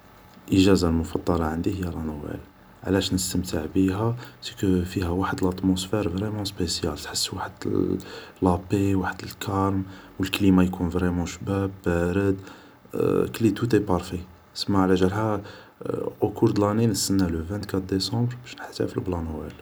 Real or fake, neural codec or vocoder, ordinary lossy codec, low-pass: real; none; none; none